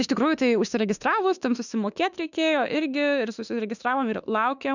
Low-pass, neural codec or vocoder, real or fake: 7.2 kHz; autoencoder, 48 kHz, 32 numbers a frame, DAC-VAE, trained on Japanese speech; fake